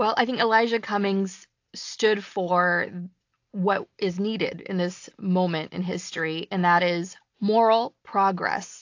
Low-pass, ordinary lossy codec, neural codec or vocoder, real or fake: 7.2 kHz; AAC, 48 kbps; none; real